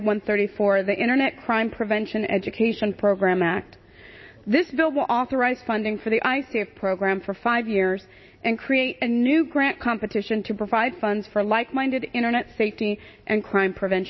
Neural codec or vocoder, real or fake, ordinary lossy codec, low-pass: none; real; MP3, 24 kbps; 7.2 kHz